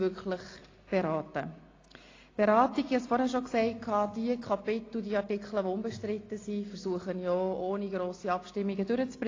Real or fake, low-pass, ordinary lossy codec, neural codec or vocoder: real; 7.2 kHz; AAC, 32 kbps; none